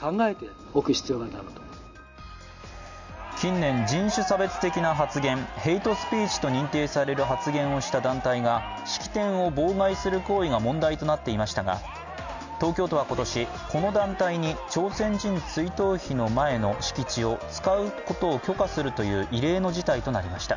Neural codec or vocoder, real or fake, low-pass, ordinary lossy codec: none; real; 7.2 kHz; none